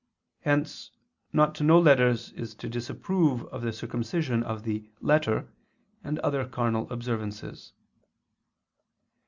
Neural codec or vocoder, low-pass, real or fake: none; 7.2 kHz; real